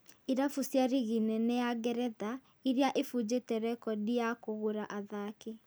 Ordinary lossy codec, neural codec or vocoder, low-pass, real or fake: none; none; none; real